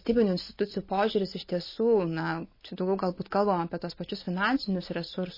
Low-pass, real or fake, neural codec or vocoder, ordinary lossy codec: 5.4 kHz; real; none; MP3, 24 kbps